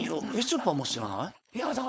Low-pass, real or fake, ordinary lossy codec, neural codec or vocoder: none; fake; none; codec, 16 kHz, 4.8 kbps, FACodec